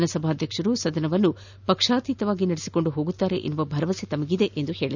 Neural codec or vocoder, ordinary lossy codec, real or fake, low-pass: none; none; real; 7.2 kHz